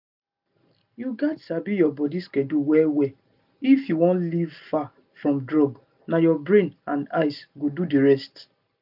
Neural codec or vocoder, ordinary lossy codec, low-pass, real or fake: none; none; 5.4 kHz; real